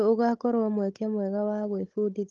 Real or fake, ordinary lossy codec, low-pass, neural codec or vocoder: fake; Opus, 16 kbps; 7.2 kHz; codec, 16 kHz, 16 kbps, FunCodec, trained on Chinese and English, 50 frames a second